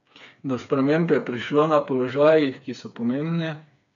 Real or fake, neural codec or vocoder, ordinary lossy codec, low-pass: fake; codec, 16 kHz, 4 kbps, FreqCodec, smaller model; AAC, 64 kbps; 7.2 kHz